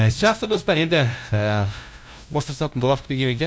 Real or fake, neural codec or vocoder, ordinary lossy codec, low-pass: fake; codec, 16 kHz, 0.5 kbps, FunCodec, trained on LibriTTS, 25 frames a second; none; none